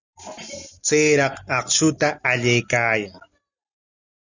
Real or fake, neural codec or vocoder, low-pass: real; none; 7.2 kHz